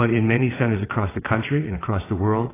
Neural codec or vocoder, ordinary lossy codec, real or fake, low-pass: vocoder, 22.05 kHz, 80 mel bands, WaveNeXt; AAC, 16 kbps; fake; 3.6 kHz